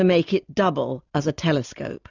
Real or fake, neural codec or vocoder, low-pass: real; none; 7.2 kHz